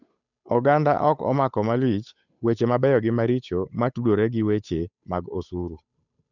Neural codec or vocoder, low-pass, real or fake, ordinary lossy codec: codec, 16 kHz, 8 kbps, FunCodec, trained on Chinese and English, 25 frames a second; 7.2 kHz; fake; none